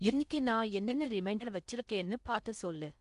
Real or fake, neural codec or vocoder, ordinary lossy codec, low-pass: fake; codec, 16 kHz in and 24 kHz out, 0.6 kbps, FocalCodec, streaming, 4096 codes; none; 10.8 kHz